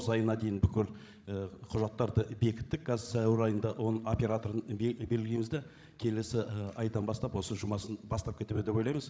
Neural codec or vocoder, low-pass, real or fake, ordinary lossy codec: codec, 16 kHz, 16 kbps, FreqCodec, larger model; none; fake; none